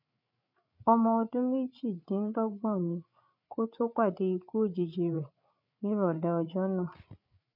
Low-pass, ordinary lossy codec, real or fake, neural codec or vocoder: 5.4 kHz; none; fake; codec, 16 kHz, 8 kbps, FreqCodec, larger model